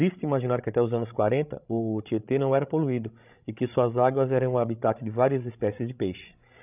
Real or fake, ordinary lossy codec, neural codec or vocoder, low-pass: fake; none; codec, 16 kHz, 16 kbps, FreqCodec, larger model; 3.6 kHz